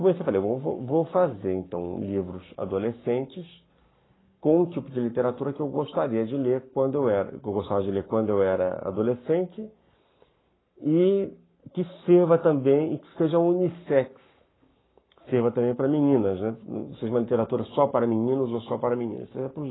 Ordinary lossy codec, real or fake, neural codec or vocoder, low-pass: AAC, 16 kbps; fake; codec, 44.1 kHz, 7.8 kbps, Pupu-Codec; 7.2 kHz